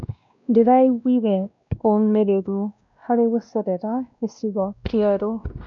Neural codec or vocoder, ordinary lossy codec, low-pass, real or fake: codec, 16 kHz, 1 kbps, X-Codec, WavLM features, trained on Multilingual LibriSpeech; none; 7.2 kHz; fake